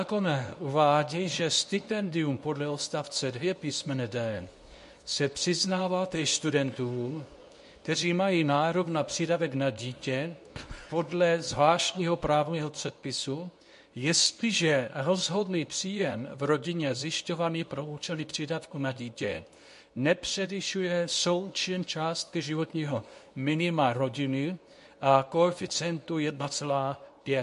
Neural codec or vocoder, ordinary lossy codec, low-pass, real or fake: codec, 24 kHz, 0.9 kbps, WavTokenizer, medium speech release version 2; MP3, 48 kbps; 10.8 kHz; fake